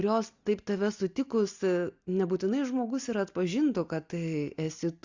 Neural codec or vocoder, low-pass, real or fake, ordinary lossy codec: none; 7.2 kHz; real; Opus, 64 kbps